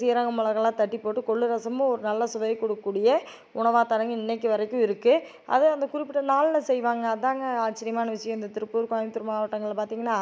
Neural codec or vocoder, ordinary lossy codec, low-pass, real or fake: none; none; none; real